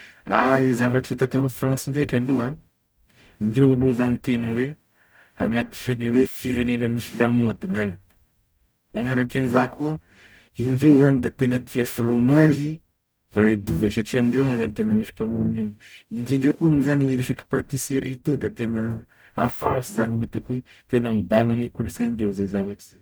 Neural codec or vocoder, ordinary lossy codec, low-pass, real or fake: codec, 44.1 kHz, 0.9 kbps, DAC; none; none; fake